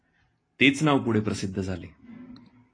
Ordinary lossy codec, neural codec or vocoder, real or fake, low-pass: AAC, 32 kbps; none; real; 9.9 kHz